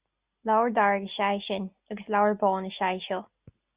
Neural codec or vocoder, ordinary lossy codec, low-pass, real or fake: none; Opus, 64 kbps; 3.6 kHz; real